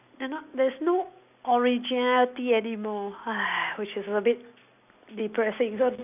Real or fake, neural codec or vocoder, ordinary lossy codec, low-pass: real; none; none; 3.6 kHz